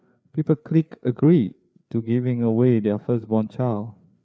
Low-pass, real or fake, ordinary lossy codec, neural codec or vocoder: none; fake; none; codec, 16 kHz, 4 kbps, FreqCodec, larger model